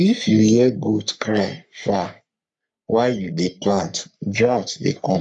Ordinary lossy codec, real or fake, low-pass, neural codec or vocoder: none; fake; 10.8 kHz; codec, 44.1 kHz, 3.4 kbps, Pupu-Codec